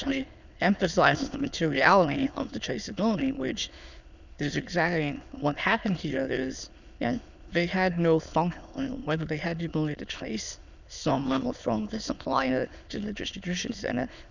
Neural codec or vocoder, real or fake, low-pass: autoencoder, 22.05 kHz, a latent of 192 numbers a frame, VITS, trained on many speakers; fake; 7.2 kHz